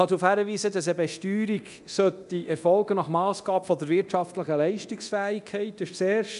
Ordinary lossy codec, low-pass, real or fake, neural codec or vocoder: none; 10.8 kHz; fake; codec, 24 kHz, 0.9 kbps, DualCodec